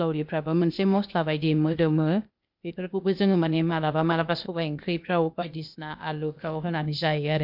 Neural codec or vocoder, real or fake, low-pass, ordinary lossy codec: codec, 16 kHz, 0.8 kbps, ZipCodec; fake; 5.4 kHz; MP3, 48 kbps